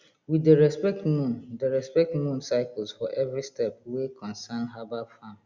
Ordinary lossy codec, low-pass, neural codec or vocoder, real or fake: Opus, 64 kbps; 7.2 kHz; none; real